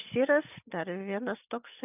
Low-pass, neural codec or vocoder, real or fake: 3.6 kHz; none; real